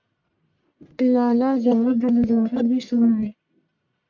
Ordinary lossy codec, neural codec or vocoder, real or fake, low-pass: MP3, 48 kbps; codec, 44.1 kHz, 1.7 kbps, Pupu-Codec; fake; 7.2 kHz